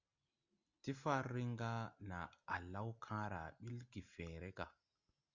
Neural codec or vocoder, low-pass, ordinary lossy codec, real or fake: none; 7.2 kHz; Opus, 64 kbps; real